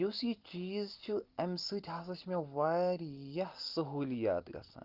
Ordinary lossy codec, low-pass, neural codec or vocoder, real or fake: Opus, 32 kbps; 5.4 kHz; none; real